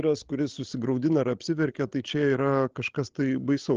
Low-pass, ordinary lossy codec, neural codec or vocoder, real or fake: 7.2 kHz; Opus, 16 kbps; codec, 16 kHz, 16 kbps, FreqCodec, larger model; fake